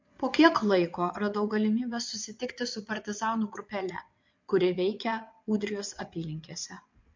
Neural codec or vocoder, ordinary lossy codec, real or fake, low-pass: vocoder, 22.05 kHz, 80 mel bands, WaveNeXt; MP3, 48 kbps; fake; 7.2 kHz